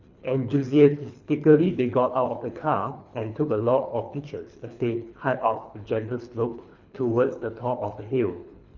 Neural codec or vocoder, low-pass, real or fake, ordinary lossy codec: codec, 24 kHz, 3 kbps, HILCodec; 7.2 kHz; fake; none